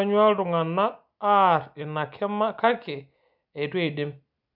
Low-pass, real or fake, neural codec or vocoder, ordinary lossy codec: 5.4 kHz; real; none; none